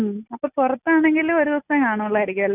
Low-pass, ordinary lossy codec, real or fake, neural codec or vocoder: 3.6 kHz; none; real; none